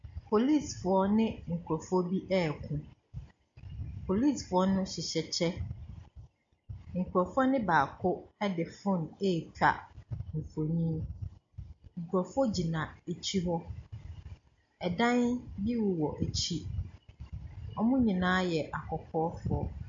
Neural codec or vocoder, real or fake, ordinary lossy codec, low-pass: none; real; MP3, 48 kbps; 7.2 kHz